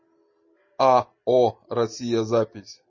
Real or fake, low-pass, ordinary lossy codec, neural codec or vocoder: real; 7.2 kHz; MP3, 32 kbps; none